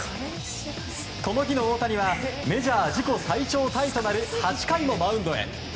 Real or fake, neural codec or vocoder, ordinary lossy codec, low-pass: real; none; none; none